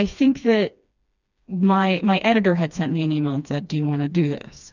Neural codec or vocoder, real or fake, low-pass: codec, 16 kHz, 2 kbps, FreqCodec, smaller model; fake; 7.2 kHz